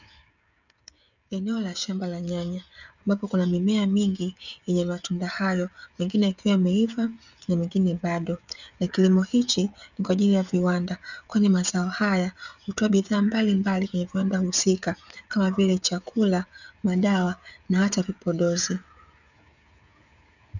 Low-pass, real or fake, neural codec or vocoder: 7.2 kHz; fake; codec, 16 kHz, 16 kbps, FreqCodec, smaller model